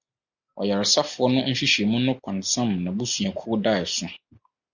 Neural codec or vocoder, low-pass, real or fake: none; 7.2 kHz; real